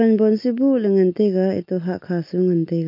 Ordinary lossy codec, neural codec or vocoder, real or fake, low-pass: MP3, 32 kbps; none; real; 5.4 kHz